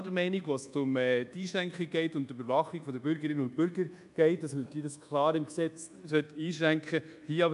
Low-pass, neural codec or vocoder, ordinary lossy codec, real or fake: 10.8 kHz; codec, 24 kHz, 1.2 kbps, DualCodec; none; fake